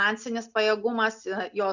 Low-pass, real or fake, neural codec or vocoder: 7.2 kHz; real; none